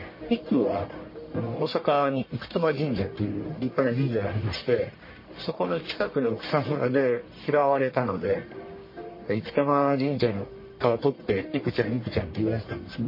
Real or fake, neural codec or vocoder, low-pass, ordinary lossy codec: fake; codec, 44.1 kHz, 1.7 kbps, Pupu-Codec; 5.4 kHz; MP3, 24 kbps